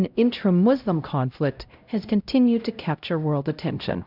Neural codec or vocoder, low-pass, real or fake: codec, 16 kHz, 0.5 kbps, X-Codec, HuBERT features, trained on LibriSpeech; 5.4 kHz; fake